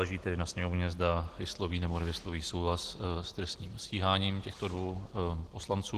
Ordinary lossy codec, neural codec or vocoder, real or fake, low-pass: Opus, 16 kbps; none; real; 14.4 kHz